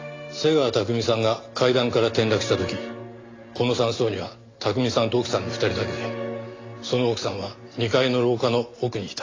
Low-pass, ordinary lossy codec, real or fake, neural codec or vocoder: 7.2 kHz; AAC, 32 kbps; real; none